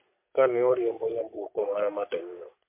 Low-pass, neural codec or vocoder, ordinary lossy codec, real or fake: 3.6 kHz; codec, 44.1 kHz, 3.4 kbps, Pupu-Codec; MP3, 32 kbps; fake